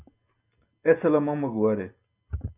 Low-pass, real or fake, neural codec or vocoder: 3.6 kHz; real; none